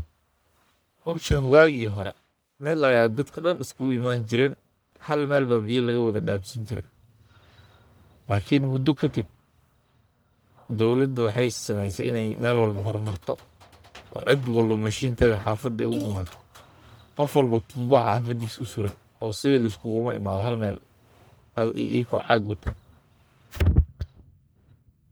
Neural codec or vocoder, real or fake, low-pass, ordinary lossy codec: codec, 44.1 kHz, 1.7 kbps, Pupu-Codec; fake; none; none